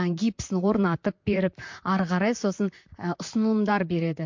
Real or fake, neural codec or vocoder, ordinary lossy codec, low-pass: fake; vocoder, 44.1 kHz, 128 mel bands, Pupu-Vocoder; MP3, 48 kbps; 7.2 kHz